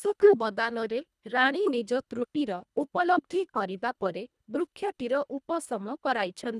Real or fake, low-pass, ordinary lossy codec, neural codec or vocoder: fake; none; none; codec, 24 kHz, 1.5 kbps, HILCodec